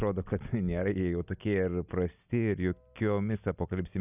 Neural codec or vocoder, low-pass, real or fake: none; 3.6 kHz; real